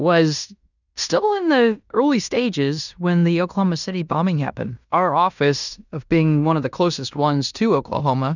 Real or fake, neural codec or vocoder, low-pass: fake; codec, 16 kHz in and 24 kHz out, 0.9 kbps, LongCat-Audio-Codec, fine tuned four codebook decoder; 7.2 kHz